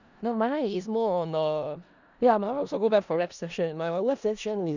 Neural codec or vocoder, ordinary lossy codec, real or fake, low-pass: codec, 16 kHz in and 24 kHz out, 0.4 kbps, LongCat-Audio-Codec, four codebook decoder; none; fake; 7.2 kHz